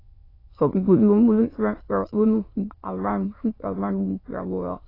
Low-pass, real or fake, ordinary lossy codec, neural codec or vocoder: 5.4 kHz; fake; AAC, 24 kbps; autoencoder, 22.05 kHz, a latent of 192 numbers a frame, VITS, trained on many speakers